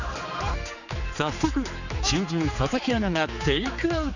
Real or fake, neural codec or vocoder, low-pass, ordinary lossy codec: fake; codec, 16 kHz, 4 kbps, X-Codec, HuBERT features, trained on general audio; 7.2 kHz; none